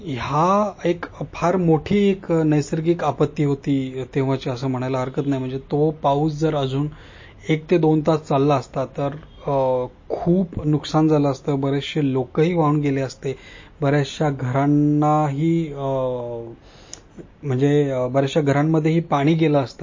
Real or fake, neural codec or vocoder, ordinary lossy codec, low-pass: real; none; MP3, 32 kbps; 7.2 kHz